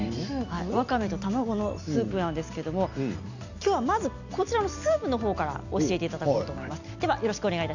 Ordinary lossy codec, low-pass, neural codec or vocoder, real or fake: none; 7.2 kHz; none; real